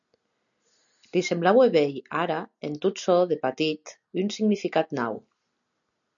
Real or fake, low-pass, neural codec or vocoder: real; 7.2 kHz; none